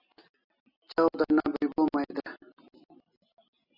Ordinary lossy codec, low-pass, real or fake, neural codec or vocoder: AAC, 24 kbps; 5.4 kHz; real; none